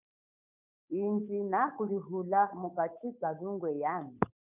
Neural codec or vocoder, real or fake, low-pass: codec, 16 kHz, 8 kbps, FunCodec, trained on Chinese and English, 25 frames a second; fake; 3.6 kHz